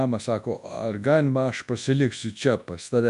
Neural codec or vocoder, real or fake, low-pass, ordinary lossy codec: codec, 24 kHz, 0.9 kbps, WavTokenizer, large speech release; fake; 10.8 kHz; AAC, 96 kbps